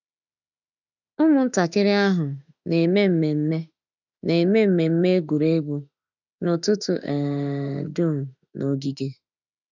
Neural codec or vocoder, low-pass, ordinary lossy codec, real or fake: autoencoder, 48 kHz, 32 numbers a frame, DAC-VAE, trained on Japanese speech; 7.2 kHz; none; fake